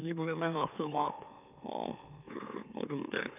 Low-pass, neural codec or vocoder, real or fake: 3.6 kHz; autoencoder, 44.1 kHz, a latent of 192 numbers a frame, MeloTTS; fake